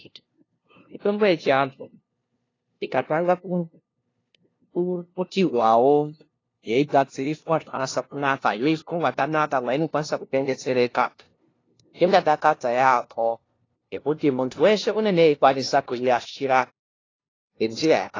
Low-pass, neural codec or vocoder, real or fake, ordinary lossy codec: 7.2 kHz; codec, 16 kHz, 0.5 kbps, FunCodec, trained on LibriTTS, 25 frames a second; fake; AAC, 32 kbps